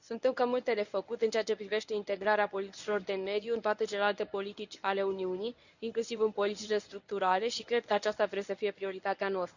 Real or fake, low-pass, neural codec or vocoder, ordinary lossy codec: fake; 7.2 kHz; codec, 24 kHz, 0.9 kbps, WavTokenizer, medium speech release version 1; none